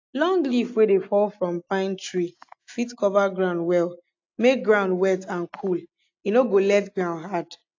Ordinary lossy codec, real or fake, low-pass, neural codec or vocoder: AAC, 48 kbps; real; 7.2 kHz; none